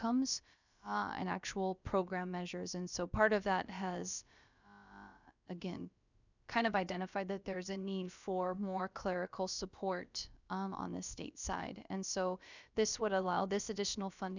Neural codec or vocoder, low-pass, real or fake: codec, 16 kHz, about 1 kbps, DyCAST, with the encoder's durations; 7.2 kHz; fake